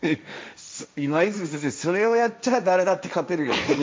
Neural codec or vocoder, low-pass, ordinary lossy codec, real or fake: codec, 16 kHz, 1.1 kbps, Voila-Tokenizer; none; none; fake